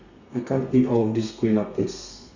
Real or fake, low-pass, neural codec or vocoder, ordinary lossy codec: fake; 7.2 kHz; codec, 32 kHz, 1.9 kbps, SNAC; Opus, 64 kbps